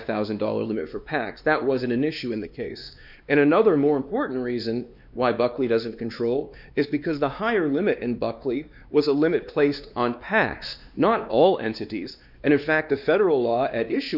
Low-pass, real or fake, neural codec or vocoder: 5.4 kHz; fake; codec, 16 kHz, 2 kbps, X-Codec, WavLM features, trained on Multilingual LibriSpeech